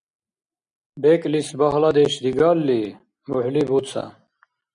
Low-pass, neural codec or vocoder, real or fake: 9.9 kHz; none; real